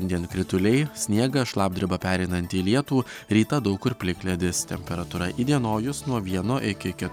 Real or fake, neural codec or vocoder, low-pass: real; none; 19.8 kHz